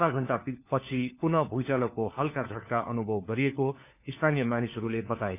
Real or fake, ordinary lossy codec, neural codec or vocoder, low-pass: fake; none; codec, 16 kHz, 4 kbps, FunCodec, trained on LibriTTS, 50 frames a second; 3.6 kHz